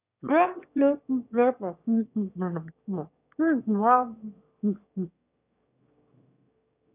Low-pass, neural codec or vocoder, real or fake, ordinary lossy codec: 3.6 kHz; autoencoder, 22.05 kHz, a latent of 192 numbers a frame, VITS, trained on one speaker; fake; none